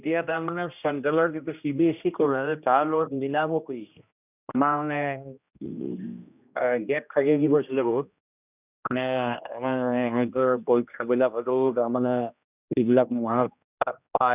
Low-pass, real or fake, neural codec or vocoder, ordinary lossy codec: 3.6 kHz; fake; codec, 16 kHz, 1 kbps, X-Codec, HuBERT features, trained on general audio; none